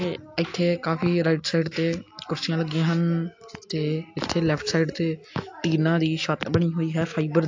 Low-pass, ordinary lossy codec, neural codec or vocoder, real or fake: 7.2 kHz; AAC, 48 kbps; none; real